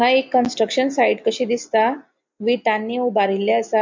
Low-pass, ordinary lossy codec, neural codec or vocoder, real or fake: 7.2 kHz; MP3, 48 kbps; none; real